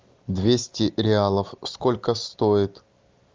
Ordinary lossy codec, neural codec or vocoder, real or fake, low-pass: Opus, 24 kbps; none; real; 7.2 kHz